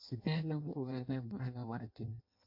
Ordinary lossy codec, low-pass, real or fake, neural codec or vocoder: MP3, 32 kbps; 5.4 kHz; fake; codec, 16 kHz in and 24 kHz out, 0.6 kbps, FireRedTTS-2 codec